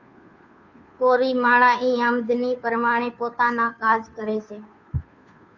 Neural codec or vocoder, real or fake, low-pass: codec, 16 kHz, 2 kbps, FunCodec, trained on Chinese and English, 25 frames a second; fake; 7.2 kHz